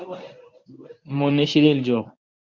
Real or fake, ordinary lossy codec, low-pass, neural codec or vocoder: fake; MP3, 48 kbps; 7.2 kHz; codec, 24 kHz, 0.9 kbps, WavTokenizer, medium speech release version 1